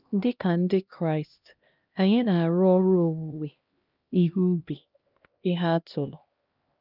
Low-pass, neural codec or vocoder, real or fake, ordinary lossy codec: 5.4 kHz; codec, 16 kHz, 1 kbps, X-Codec, HuBERT features, trained on LibriSpeech; fake; Opus, 24 kbps